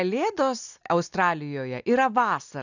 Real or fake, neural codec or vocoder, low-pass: real; none; 7.2 kHz